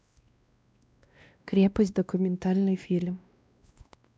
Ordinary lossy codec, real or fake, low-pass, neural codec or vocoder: none; fake; none; codec, 16 kHz, 1 kbps, X-Codec, WavLM features, trained on Multilingual LibriSpeech